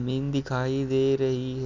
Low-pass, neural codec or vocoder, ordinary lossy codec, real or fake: 7.2 kHz; none; none; real